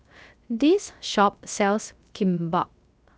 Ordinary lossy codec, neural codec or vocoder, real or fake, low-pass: none; codec, 16 kHz, 0.3 kbps, FocalCodec; fake; none